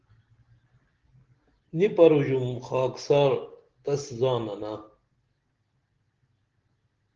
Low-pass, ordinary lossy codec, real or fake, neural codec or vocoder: 7.2 kHz; Opus, 16 kbps; real; none